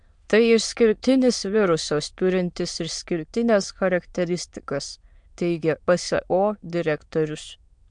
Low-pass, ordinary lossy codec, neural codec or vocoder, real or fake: 9.9 kHz; MP3, 64 kbps; autoencoder, 22.05 kHz, a latent of 192 numbers a frame, VITS, trained on many speakers; fake